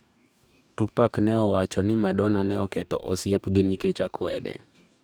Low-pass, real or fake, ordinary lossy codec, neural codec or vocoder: none; fake; none; codec, 44.1 kHz, 2.6 kbps, DAC